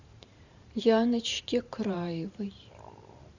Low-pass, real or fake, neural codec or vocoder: 7.2 kHz; fake; vocoder, 44.1 kHz, 128 mel bands every 512 samples, BigVGAN v2